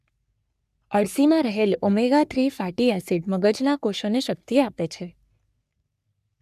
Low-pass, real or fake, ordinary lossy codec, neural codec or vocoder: 14.4 kHz; fake; none; codec, 44.1 kHz, 3.4 kbps, Pupu-Codec